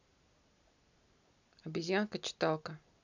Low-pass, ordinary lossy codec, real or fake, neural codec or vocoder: 7.2 kHz; none; real; none